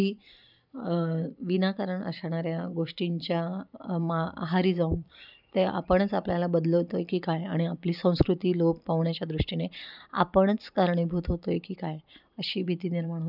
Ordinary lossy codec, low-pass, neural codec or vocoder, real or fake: none; 5.4 kHz; vocoder, 44.1 kHz, 128 mel bands every 256 samples, BigVGAN v2; fake